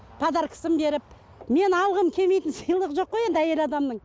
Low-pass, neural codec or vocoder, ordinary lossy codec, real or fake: none; none; none; real